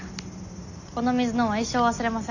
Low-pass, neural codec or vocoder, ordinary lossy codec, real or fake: 7.2 kHz; none; none; real